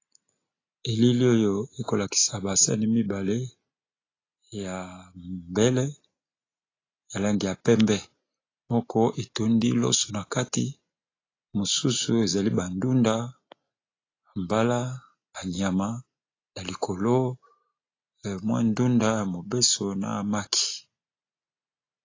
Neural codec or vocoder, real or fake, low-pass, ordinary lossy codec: none; real; 7.2 kHz; AAC, 32 kbps